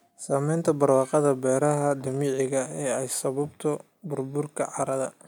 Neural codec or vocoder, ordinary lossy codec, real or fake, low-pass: none; none; real; none